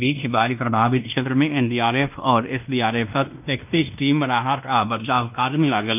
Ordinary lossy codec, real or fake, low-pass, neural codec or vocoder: none; fake; 3.6 kHz; codec, 16 kHz in and 24 kHz out, 0.9 kbps, LongCat-Audio-Codec, fine tuned four codebook decoder